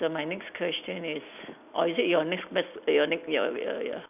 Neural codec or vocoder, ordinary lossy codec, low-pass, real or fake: none; none; 3.6 kHz; real